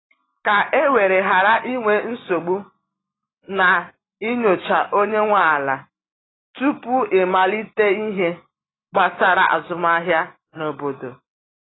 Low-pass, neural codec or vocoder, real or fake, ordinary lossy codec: 7.2 kHz; none; real; AAC, 16 kbps